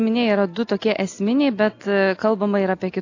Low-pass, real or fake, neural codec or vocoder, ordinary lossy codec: 7.2 kHz; real; none; AAC, 48 kbps